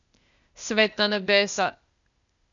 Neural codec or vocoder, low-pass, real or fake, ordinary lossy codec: codec, 16 kHz, 0.8 kbps, ZipCodec; 7.2 kHz; fake; none